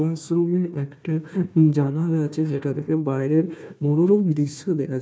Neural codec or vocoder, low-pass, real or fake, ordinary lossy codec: codec, 16 kHz, 1 kbps, FunCodec, trained on Chinese and English, 50 frames a second; none; fake; none